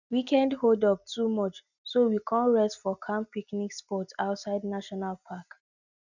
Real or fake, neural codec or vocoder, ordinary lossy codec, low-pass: real; none; none; 7.2 kHz